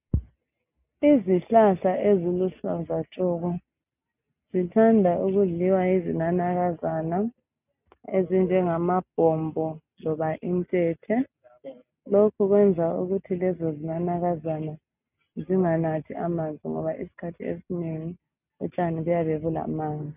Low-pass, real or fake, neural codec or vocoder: 3.6 kHz; real; none